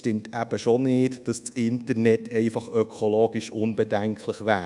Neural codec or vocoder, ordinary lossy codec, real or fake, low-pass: codec, 24 kHz, 1.2 kbps, DualCodec; none; fake; 10.8 kHz